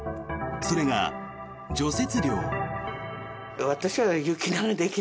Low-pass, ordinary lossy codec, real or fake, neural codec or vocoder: none; none; real; none